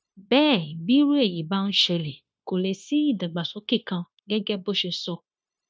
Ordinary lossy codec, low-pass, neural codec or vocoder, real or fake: none; none; codec, 16 kHz, 0.9 kbps, LongCat-Audio-Codec; fake